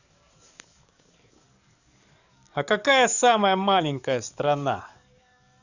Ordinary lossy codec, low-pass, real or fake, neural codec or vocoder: none; 7.2 kHz; fake; codec, 44.1 kHz, 7.8 kbps, DAC